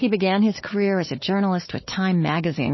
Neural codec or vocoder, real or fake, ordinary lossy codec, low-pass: codec, 16 kHz, 4 kbps, FunCodec, trained on Chinese and English, 50 frames a second; fake; MP3, 24 kbps; 7.2 kHz